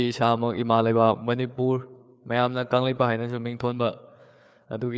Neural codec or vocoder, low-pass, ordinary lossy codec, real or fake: codec, 16 kHz, 4 kbps, FreqCodec, larger model; none; none; fake